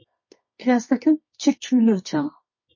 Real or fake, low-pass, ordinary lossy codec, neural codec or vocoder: fake; 7.2 kHz; MP3, 32 kbps; codec, 24 kHz, 0.9 kbps, WavTokenizer, medium music audio release